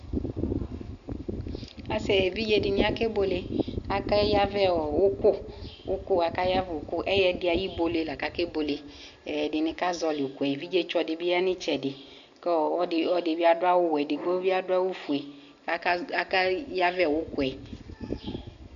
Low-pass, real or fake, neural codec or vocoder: 7.2 kHz; real; none